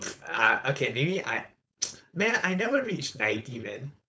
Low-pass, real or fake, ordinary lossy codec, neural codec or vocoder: none; fake; none; codec, 16 kHz, 4.8 kbps, FACodec